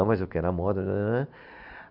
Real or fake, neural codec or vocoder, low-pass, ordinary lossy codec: real; none; 5.4 kHz; none